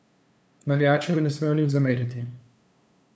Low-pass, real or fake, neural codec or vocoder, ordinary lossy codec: none; fake; codec, 16 kHz, 2 kbps, FunCodec, trained on LibriTTS, 25 frames a second; none